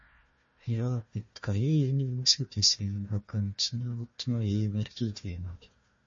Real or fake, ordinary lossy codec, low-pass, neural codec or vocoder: fake; MP3, 32 kbps; 7.2 kHz; codec, 16 kHz, 1 kbps, FunCodec, trained on Chinese and English, 50 frames a second